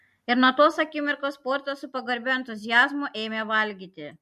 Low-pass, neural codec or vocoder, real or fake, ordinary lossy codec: 14.4 kHz; none; real; MP3, 64 kbps